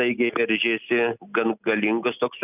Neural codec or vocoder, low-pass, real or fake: none; 3.6 kHz; real